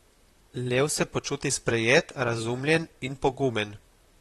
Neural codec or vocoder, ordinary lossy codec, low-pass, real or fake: vocoder, 44.1 kHz, 128 mel bands, Pupu-Vocoder; AAC, 32 kbps; 19.8 kHz; fake